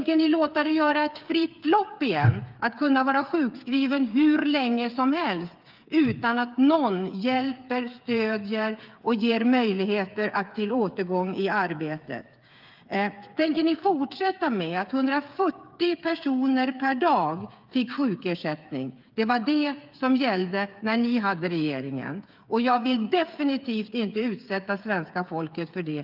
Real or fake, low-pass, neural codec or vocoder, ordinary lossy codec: fake; 5.4 kHz; codec, 16 kHz, 8 kbps, FreqCodec, smaller model; Opus, 24 kbps